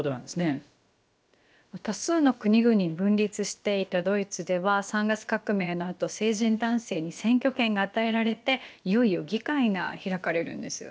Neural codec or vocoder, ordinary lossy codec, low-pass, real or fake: codec, 16 kHz, about 1 kbps, DyCAST, with the encoder's durations; none; none; fake